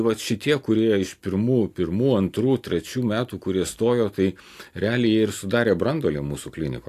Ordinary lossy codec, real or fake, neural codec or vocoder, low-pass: AAC, 64 kbps; real; none; 14.4 kHz